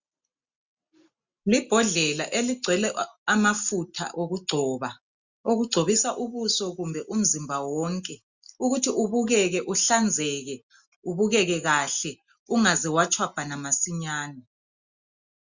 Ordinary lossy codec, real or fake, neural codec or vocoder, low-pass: Opus, 64 kbps; real; none; 7.2 kHz